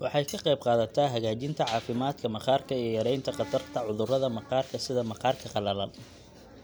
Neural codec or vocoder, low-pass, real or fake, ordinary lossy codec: none; none; real; none